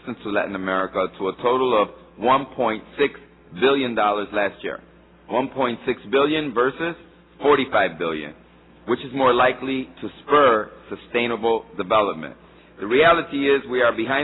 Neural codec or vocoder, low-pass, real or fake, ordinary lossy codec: none; 7.2 kHz; real; AAC, 16 kbps